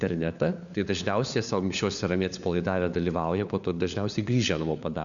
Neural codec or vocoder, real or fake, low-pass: codec, 16 kHz, 4 kbps, FunCodec, trained on LibriTTS, 50 frames a second; fake; 7.2 kHz